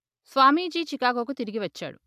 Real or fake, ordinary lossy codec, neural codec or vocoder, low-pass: real; AAC, 96 kbps; none; 14.4 kHz